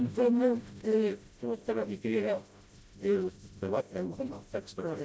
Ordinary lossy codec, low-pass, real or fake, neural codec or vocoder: none; none; fake; codec, 16 kHz, 0.5 kbps, FreqCodec, smaller model